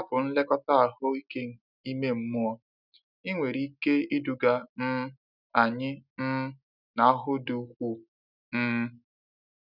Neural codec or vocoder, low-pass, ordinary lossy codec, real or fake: none; 5.4 kHz; none; real